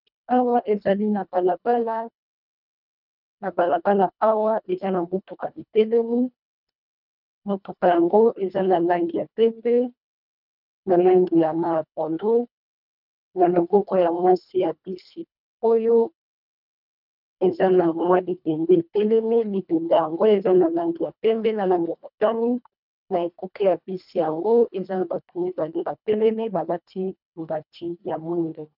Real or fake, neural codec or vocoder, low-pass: fake; codec, 24 kHz, 1.5 kbps, HILCodec; 5.4 kHz